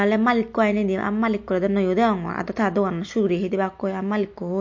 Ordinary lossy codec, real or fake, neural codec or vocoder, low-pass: MP3, 48 kbps; real; none; 7.2 kHz